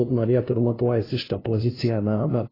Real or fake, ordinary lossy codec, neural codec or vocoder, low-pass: fake; AAC, 24 kbps; codec, 16 kHz, 0.5 kbps, FunCodec, trained on LibriTTS, 25 frames a second; 5.4 kHz